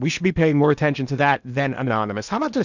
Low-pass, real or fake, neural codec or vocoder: 7.2 kHz; fake; codec, 16 kHz in and 24 kHz out, 0.8 kbps, FocalCodec, streaming, 65536 codes